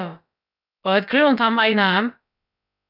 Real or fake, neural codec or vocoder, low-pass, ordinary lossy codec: fake; codec, 16 kHz, about 1 kbps, DyCAST, with the encoder's durations; 5.4 kHz; none